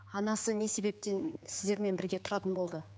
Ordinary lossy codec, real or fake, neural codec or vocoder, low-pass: none; fake; codec, 16 kHz, 4 kbps, X-Codec, HuBERT features, trained on general audio; none